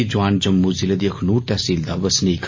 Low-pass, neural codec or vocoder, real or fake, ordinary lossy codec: 7.2 kHz; none; real; MP3, 32 kbps